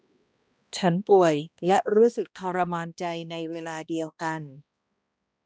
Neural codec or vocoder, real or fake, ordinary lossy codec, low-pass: codec, 16 kHz, 1 kbps, X-Codec, HuBERT features, trained on balanced general audio; fake; none; none